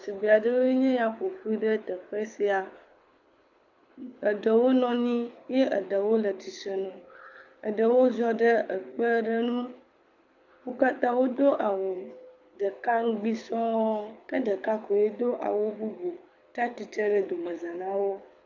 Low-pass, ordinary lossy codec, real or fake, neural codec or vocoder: 7.2 kHz; AAC, 48 kbps; fake; codec, 24 kHz, 6 kbps, HILCodec